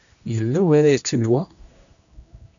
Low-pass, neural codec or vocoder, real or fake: 7.2 kHz; codec, 16 kHz, 1 kbps, X-Codec, HuBERT features, trained on general audio; fake